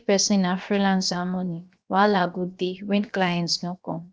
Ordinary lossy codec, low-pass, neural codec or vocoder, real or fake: none; none; codec, 16 kHz, 0.7 kbps, FocalCodec; fake